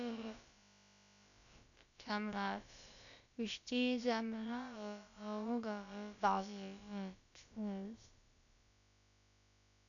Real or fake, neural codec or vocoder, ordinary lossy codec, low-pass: fake; codec, 16 kHz, about 1 kbps, DyCAST, with the encoder's durations; none; 7.2 kHz